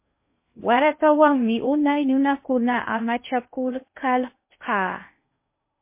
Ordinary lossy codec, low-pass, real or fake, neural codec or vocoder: MP3, 24 kbps; 3.6 kHz; fake; codec, 16 kHz in and 24 kHz out, 0.6 kbps, FocalCodec, streaming, 2048 codes